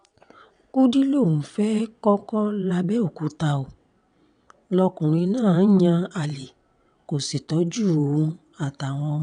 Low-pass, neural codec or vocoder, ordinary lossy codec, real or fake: 9.9 kHz; vocoder, 22.05 kHz, 80 mel bands, WaveNeXt; none; fake